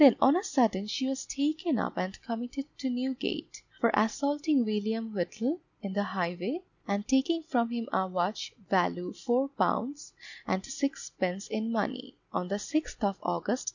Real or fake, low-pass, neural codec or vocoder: real; 7.2 kHz; none